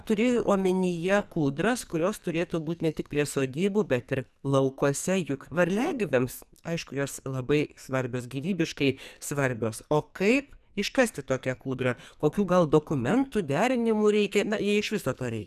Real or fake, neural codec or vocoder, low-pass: fake; codec, 44.1 kHz, 2.6 kbps, SNAC; 14.4 kHz